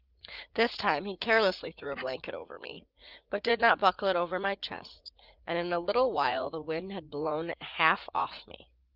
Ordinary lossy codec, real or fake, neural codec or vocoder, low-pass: Opus, 32 kbps; fake; vocoder, 44.1 kHz, 80 mel bands, Vocos; 5.4 kHz